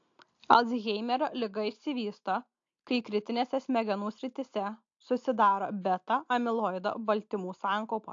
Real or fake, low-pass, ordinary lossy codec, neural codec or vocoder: real; 7.2 kHz; AAC, 48 kbps; none